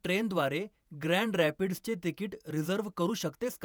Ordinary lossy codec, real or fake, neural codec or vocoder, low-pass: none; fake; vocoder, 48 kHz, 128 mel bands, Vocos; none